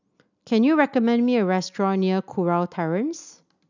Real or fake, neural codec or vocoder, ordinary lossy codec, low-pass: real; none; none; 7.2 kHz